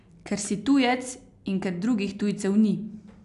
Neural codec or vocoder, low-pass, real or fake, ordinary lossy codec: none; 10.8 kHz; real; AAC, 96 kbps